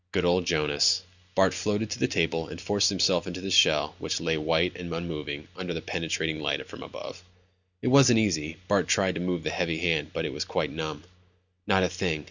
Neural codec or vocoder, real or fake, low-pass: none; real; 7.2 kHz